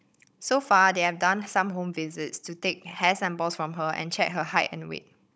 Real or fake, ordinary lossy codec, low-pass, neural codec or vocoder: fake; none; none; codec, 16 kHz, 16 kbps, FunCodec, trained on Chinese and English, 50 frames a second